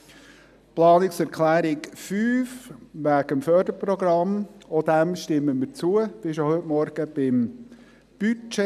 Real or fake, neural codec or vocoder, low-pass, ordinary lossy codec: real; none; 14.4 kHz; none